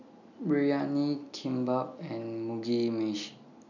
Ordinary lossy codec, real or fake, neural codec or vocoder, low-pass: none; real; none; 7.2 kHz